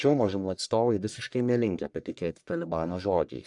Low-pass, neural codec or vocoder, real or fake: 10.8 kHz; codec, 44.1 kHz, 1.7 kbps, Pupu-Codec; fake